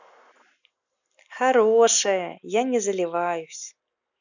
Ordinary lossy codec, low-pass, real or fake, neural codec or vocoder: none; 7.2 kHz; real; none